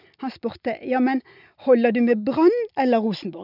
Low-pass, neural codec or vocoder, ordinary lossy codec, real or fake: 5.4 kHz; none; none; real